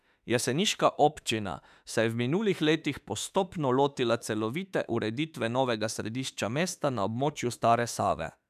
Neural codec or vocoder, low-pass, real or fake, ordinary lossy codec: autoencoder, 48 kHz, 32 numbers a frame, DAC-VAE, trained on Japanese speech; 14.4 kHz; fake; none